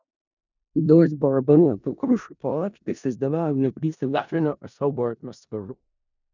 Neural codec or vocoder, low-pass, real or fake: codec, 16 kHz in and 24 kHz out, 0.4 kbps, LongCat-Audio-Codec, four codebook decoder; 7.2 kHz; fake